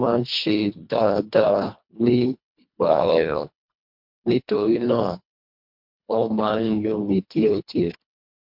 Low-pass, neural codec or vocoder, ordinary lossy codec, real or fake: 5.4 kHz; codec, 24 kHz, 1.5 kbps, HILCodec; MP3, 48 kbps; fake